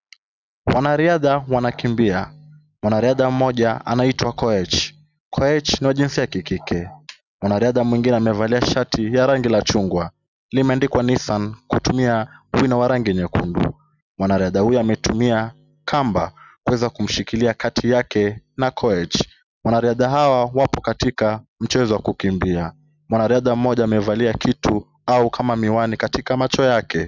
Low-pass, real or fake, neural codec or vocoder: 7.2 kHz; real; none